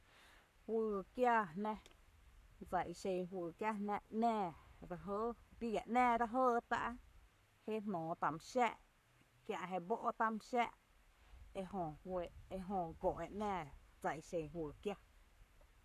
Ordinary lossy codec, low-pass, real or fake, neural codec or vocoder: none; 14.4 kHz; fake; codec, 44.1 kHz, 7.8 kbps, Pupu-Codec